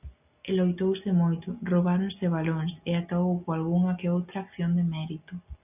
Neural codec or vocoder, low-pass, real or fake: none; 3.6 kHz; real